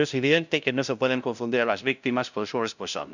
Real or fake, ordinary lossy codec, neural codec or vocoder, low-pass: fake; none; codec, 16 kHz, 0.5 kbps, FunCodec, trained on LibriTTS, 25 frames a second; 7.2 kHz